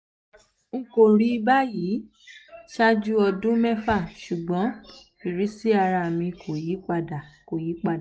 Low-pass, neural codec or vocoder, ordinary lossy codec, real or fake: none; none; none; real